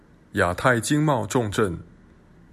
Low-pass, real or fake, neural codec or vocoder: 14.4 kHz; real; none